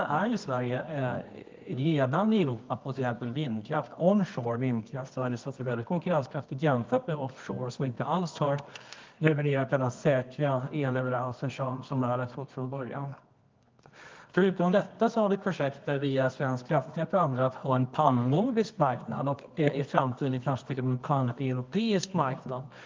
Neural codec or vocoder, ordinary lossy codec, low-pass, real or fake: codec, 24 kHz, 0.9 kbps, WavTokenizer, medium music audio release; Opus, 32 kbps; 7.2 kHz; fake